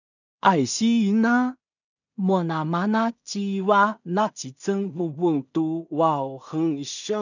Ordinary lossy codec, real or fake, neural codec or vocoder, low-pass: none; fake; codec, 16 kHz in and 24 kHz out, 0.4 kbps, LongCat-Audio-Codec, two codebook decoder; 7.2 kHz